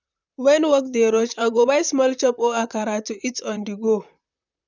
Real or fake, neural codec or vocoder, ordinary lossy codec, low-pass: fake; vocoder, 44.1 kHz, 128 mel bands, Pupu-Vocoder; none; 7.2 kHz